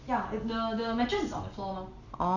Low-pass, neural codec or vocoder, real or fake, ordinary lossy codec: 7.2 kHz; none; real; none